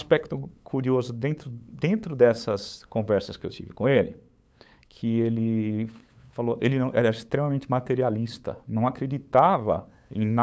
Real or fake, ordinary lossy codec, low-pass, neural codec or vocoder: fake; none; none; codec, 16 kHz, 8 kbps, FunCodec, trained on LibriTTS, 25 frames a second